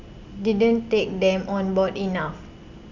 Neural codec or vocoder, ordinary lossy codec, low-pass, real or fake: none; Opus, 64 kbps; 7.2 kHz; real